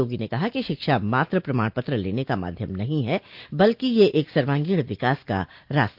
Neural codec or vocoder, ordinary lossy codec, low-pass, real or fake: none; Opus, 24 kbps; 5.4 kHz; real